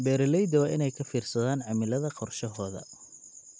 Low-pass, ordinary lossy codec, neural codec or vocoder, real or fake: none; none; none; real